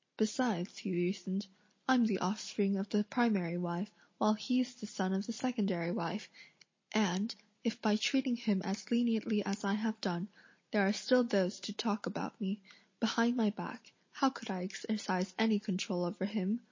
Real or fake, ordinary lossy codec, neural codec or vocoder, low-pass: real; MP3, 32 kbps; none; 7.2 kHz